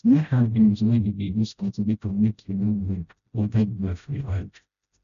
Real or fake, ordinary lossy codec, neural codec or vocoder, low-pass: fake; none; codec, 16 kHz, 0.5 kbps, FreqCodec, smaller model; 7.2 kHz